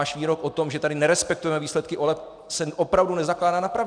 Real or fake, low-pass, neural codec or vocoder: real; 9.9 kHz; none